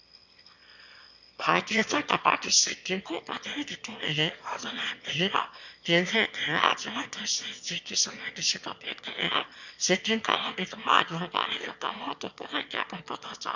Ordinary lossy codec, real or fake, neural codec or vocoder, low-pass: none; fake; autoencoder, 22.05 kHz, a latent of 192 numbers a frame, VITS, trained on one speaker; 7.2 kHz